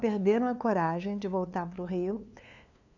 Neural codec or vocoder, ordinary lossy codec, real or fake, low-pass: codec, 16 kHz, 2 kbps, FunCodec, trained on LibriTTS, 25 frames a second; none; fake; 7.2 kHz